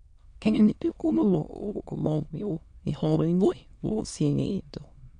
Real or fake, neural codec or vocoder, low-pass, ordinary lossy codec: fake; autoencoder, 22.05 kHz, a latent of 192 numbers a frame, VITS, trained on many speakers; 9.9 kHz; MP3, 64 kbps